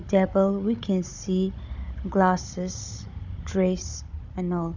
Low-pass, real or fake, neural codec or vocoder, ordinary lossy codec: 7.2 kHz; fake; codec, 16 kHz, 16 kbps, FunCodec, trained on Chinese and English, 50 frames a second; none